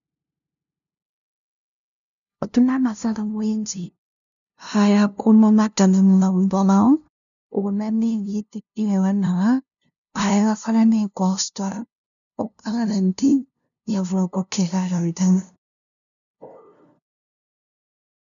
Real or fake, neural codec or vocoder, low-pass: fake; codec, 16 kHz, 0.5 kbps, FunCodec, trained on LibriTTS, 25 frames a second; 7.2 kHz